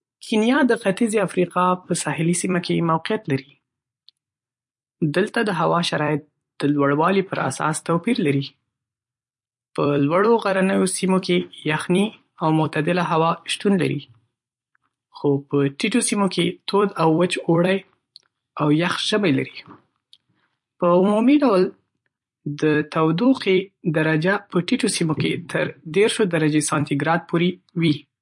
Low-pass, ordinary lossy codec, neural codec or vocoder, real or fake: 10.8 kHz; MP3, 48 kbps; vocoder, 44.1 kHz, 128 mel bands every 256 samples, BigVGAN v2; fake